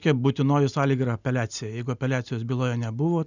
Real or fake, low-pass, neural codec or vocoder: real; 7.2 kHz; none